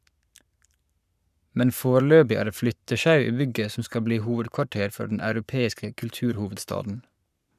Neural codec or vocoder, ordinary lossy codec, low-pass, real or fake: codec, 44.1 kHz, 7.8 kbps, Pupu-Codec; none; 14.4 kHz; fake